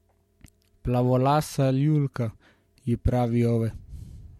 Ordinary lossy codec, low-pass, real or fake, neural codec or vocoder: MP3, 64 kbps; 19.8 kHz; fake; vocoder, 44.1 kHz, 128 mel bands every 512 samples, BigVGAN v2